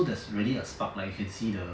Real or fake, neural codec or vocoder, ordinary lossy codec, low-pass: real; none; none; none